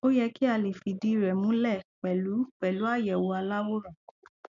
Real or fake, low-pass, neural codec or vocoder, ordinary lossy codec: real; 7.2 kHz; none; none